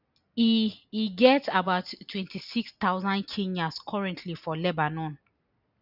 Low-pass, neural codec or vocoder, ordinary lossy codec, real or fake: 5.4 kHz; none; none; real